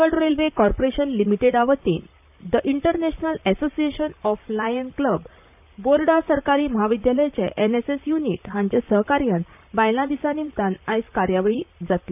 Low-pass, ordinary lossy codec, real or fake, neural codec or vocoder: 3.6 kHz; none; fake; vocoder, 44.1 kHz, 128 mel bands, Pupu-Vocoder